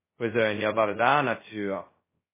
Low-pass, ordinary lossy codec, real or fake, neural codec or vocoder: 3.6 kHz; MP3, 16 kbps; fake; codec, 16 kHz, 0.2 kbps, FocalCodec